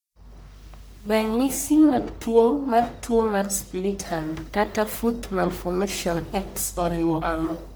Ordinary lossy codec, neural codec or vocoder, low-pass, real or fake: none; codec, 44.1 kHz, 1.7 kbps, Pupu-Codec; none; fake